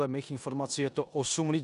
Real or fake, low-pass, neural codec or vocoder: fake; 10.8 kHz; codec, 16 kHz in and 24 kHz out, 0.9 kbps, LongCat-Audio-Codec, four codebook decoder